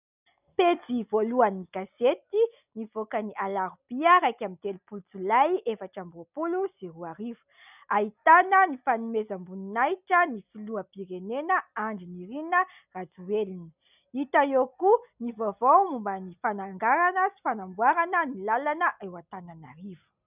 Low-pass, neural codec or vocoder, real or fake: 3.6 kHz; none; real